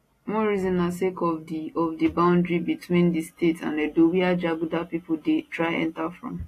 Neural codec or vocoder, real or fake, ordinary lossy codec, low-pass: none; real; AAC, 48 kbps; 14.4 kHz